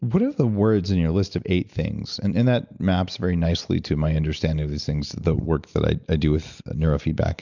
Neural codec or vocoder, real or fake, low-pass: none; real; 7.2 kHz